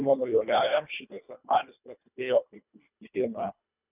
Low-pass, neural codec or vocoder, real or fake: 3.6 kHz; codec, 24 kHz, 1.5 kbps, HILCodec; fake